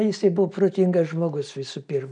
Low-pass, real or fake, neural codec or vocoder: 9.9 kHz; real; none